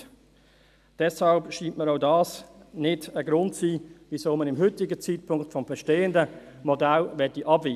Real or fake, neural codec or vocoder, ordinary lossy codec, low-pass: real; none; none; 14.4 kHz